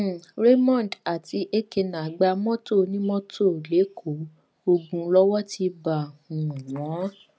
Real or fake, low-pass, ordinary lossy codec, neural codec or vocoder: real; none; none; none